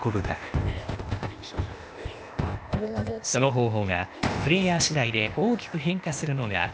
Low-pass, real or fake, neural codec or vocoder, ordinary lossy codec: none; fake; codec, 16 kHz, 0.8 kbps, ZipCodec; none